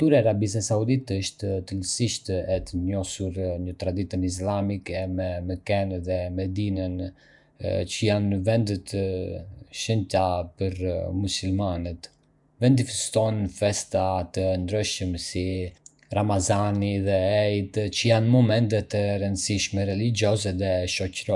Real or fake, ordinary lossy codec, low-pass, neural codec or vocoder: fake; none; 10.8 kHz; vocoder, 48 kHz, 128 mel bands, Vocos